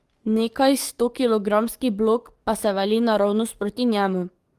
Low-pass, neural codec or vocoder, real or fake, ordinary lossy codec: 14.4 kHz; codec, 44.1 kHz, 7.8 kbps, DAC; fake; Opus, 24 kbps